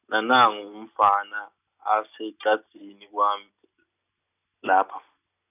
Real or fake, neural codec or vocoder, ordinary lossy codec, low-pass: real; none; none; 3.6 kHz